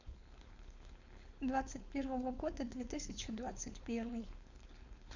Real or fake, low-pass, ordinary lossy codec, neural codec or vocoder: fake; 7.2 kHz; none; codec, 16 kHz, 4.8 kbps, FACodec